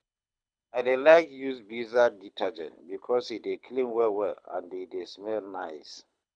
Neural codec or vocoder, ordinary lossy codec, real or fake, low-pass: codec, 24 kHz, 6 kbps, HILCodec; none; fake; 9.9 kHz